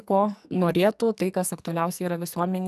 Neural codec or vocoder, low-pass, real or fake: codec, 44.1 kHz, 2.6 kbps, SNAC; 14.4 kHz; fake